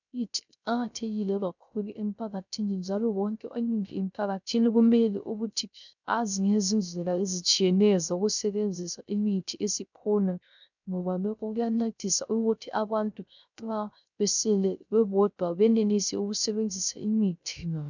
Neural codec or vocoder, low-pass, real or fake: codec, 16 kHz, 0.3 kbps, FocalCodec; 7.2 kHz; fake